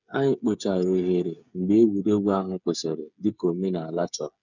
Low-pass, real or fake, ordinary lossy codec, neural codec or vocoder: 7.2 kHz; fake; none; codec, 16 kHz, 8 kbps, FreqCodec, smaller model